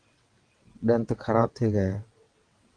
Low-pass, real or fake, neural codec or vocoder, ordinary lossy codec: 9.9 kHz; fake; vocoder, 22.05 kHz, 80 mel bands, WaveNeXt; Opus, 24 kbps